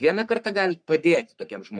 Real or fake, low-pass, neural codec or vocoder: fake; 9.9 kHz; codec, 44.1 kHz, 3.4 kbps, Pupu-Codec